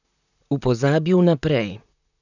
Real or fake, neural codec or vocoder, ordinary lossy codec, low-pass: fake; vocoder, 44.1 kHz, 128 mel bands, Pupu-Vocoder; none; 7.2 kHz